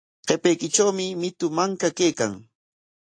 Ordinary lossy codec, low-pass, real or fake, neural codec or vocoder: AAC, 48 kbps; 9.9 kHz; real; none